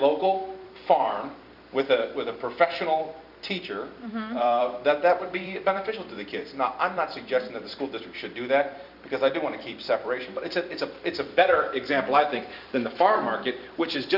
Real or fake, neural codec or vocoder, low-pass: fake; vocoder, 44.1 kHz, 128 mel bands every 256 samples, BigVGAN v2; 5.4 kHz